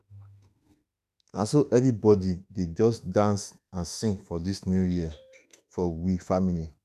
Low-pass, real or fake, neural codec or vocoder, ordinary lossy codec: 14.4 kHz; fake; autoencoder, 48 kHz, 32 numbers a frame, DAC-VAE, trained on Japanese speech; none